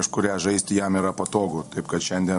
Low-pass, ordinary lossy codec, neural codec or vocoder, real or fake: 14.4 kHz; MP3, 48 kbps; none; real